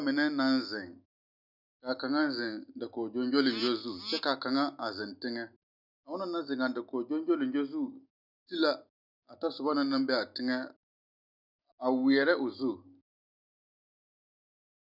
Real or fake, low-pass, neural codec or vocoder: real; 5.4 kHz; none